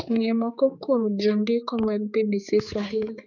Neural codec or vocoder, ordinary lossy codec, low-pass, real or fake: codec, 16 kHz, 4 kbps, X-Codec, HuBERT features, trained on general audio; none; 7.2 kHz; fake